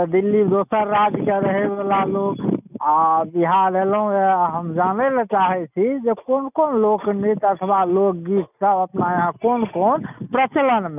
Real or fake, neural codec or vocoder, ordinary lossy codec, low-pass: real; none; none; 3.6 kHz